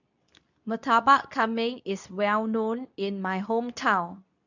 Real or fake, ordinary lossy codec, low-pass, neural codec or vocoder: fake; none; 7.2 kHz; codec, 24 kHz, 0.9 kbps, WavTokenizer, medium speech release version 2